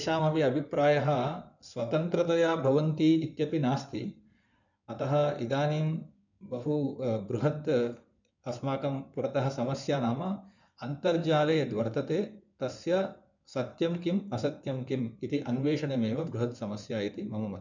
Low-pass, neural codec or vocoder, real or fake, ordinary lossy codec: 7.2 kHz; codec, 16 kHz in and 24 kHz out, 2.2 kbps, FireRedTTS-2 codec; fake; none